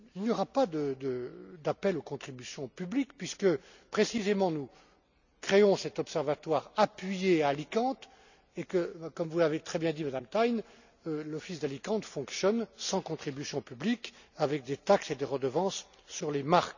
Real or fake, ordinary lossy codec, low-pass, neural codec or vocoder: real; none; 7.2 kHz; none